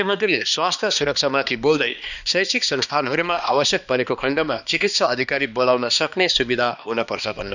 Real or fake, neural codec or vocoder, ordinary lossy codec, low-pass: fake; codec, 16 kHz, 2 kbps, X-Codec, HuBERT features, trained on balanced general audio; none; 7.2 kHz